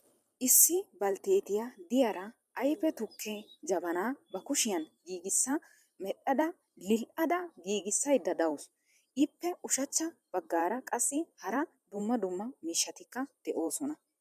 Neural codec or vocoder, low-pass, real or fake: vocoder, 44.1 kHz, 128 mel bands every 512 samples, BigVGAN v2; 14.4 kHz; fake